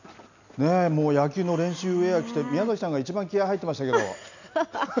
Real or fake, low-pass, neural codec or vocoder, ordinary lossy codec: real; 7.2 kHz; none; none